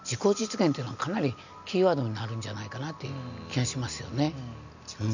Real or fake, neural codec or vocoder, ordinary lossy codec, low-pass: real; none; none; 7.2 kHz